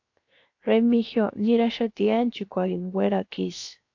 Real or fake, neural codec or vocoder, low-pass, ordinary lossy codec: fake; codec, 16 kHz, 0.7 kbps, FocalCodec; 7.2 kHz; AAC, 48 kbps